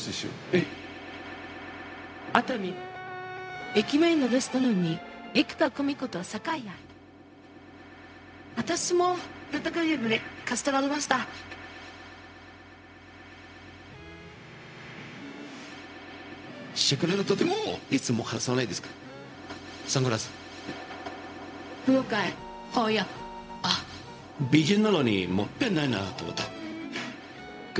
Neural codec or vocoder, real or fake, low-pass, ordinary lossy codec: codec, 16 kHz, 0.4 kbps, LongCat-Audio-Codec; fake; none; none